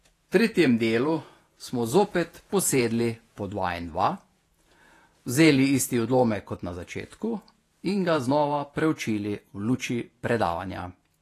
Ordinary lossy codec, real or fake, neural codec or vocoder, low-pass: AAC, 48 kbps; fake; vocoder, 48 kHz, 128 mel bands, Vocos; 14.4 kHz